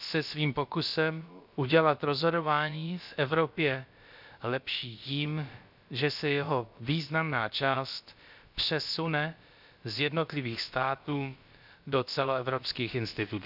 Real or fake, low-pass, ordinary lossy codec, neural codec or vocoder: fake; 5.4 kHz; none; codec, 16 kHz, about 1 kbps, DyCAST, with the encoder's durations